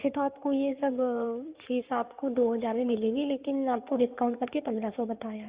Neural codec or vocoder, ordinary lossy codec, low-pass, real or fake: codec, 16 kHz in and 24 kHz out, 2.2 kbps, FireRedTTS-2 codec; Opus, 64 kbps; 3.6 kHz; fake